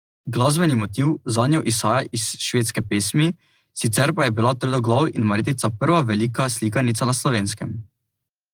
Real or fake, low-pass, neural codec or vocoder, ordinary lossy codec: fake; 19.8 kHz; vocoder, 48 kHz, 128 mel bands, Vocos; Opus, 24 kbps